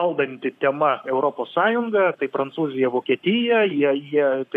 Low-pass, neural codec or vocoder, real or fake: 14.4 kHz; codec, 44.1 kHz, 7.8 kbps, Pupu-Codec; fake